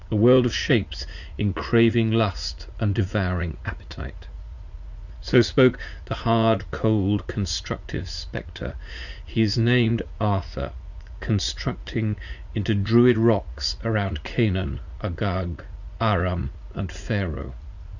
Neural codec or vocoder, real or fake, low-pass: vocoder, 44.1 kHz, 80 mel bands, Vocos; fake; 7.2 kHz